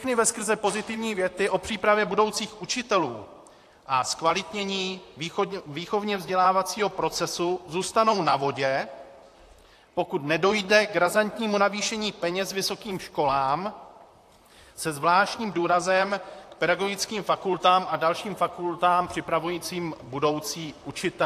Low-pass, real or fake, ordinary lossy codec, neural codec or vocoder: 14.4 kHz; fake; AAC, 64 kbps; vocoder, 44.1 kHz, 128 mel bands, Pupu-Vocoder